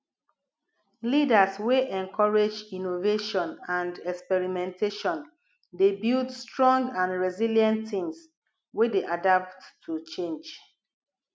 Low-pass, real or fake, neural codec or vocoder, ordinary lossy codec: none; real; none; none